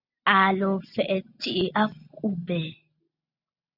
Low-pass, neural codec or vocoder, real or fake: 5.4 kHz; none; real